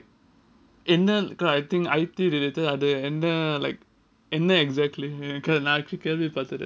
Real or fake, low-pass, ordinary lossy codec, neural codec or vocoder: real; none; none; none